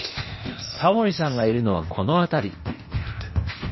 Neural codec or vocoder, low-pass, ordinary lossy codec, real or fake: codec, 16 kHz, 0.8 kbps, ZipCodec; 7.2 kHz; MP3, 24 kbps; fake